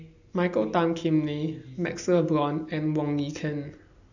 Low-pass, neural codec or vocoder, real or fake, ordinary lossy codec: 7.2 kHz; none; real; none